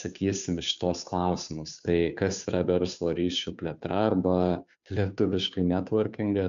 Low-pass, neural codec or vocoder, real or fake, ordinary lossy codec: 7.2 kHz; codec, 16 kHz, 2 kbps, FunCodec, trained on Chinese and English, 25 frames a second; fake; MP3, 64 kbps